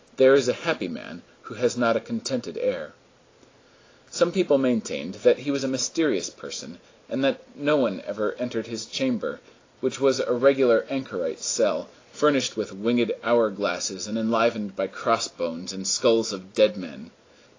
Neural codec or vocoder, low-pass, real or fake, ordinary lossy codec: none; 7.2 kHz; real; AAC, 32 kbps